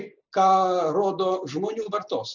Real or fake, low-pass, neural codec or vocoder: real; 7.2 kHz; none